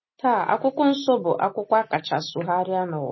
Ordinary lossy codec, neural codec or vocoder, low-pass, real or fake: MP3, 24 kbps; none; 7.2 kHz; real